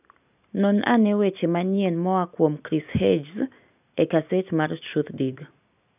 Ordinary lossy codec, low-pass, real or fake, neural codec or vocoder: none; 3.6 kHz; real; none